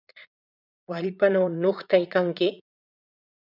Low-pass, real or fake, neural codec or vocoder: 5.4 kHz; fake; vocoder, 22.05 kHz, 80 mel bands, Vocos